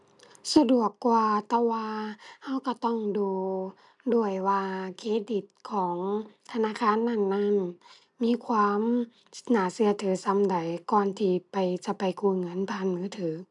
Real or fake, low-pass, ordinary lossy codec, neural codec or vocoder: real; 10.8 kHz; none; none